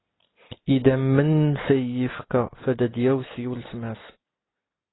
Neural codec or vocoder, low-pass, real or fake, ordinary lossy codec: none; 7.2 kHz; real; AAC, 16 kbps